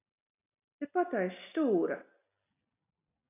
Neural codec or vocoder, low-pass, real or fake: none; 3.6 kHz; real